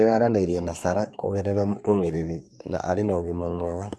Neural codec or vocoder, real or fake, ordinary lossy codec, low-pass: codec, 24 kHz, 1 kbps, SNAC; fake; none; none